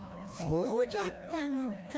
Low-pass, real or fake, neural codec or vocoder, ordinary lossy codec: none; fake; codec, 16 kHz, 1 kbps, FreqCodec, larger model; none